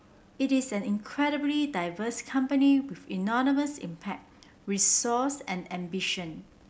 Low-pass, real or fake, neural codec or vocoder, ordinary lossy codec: none; real; none; none